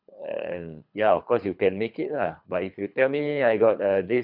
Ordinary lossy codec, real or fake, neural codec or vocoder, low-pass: none; fake; codec, 24 kHz, 3 kbps, HILCodec; 5.4 kHz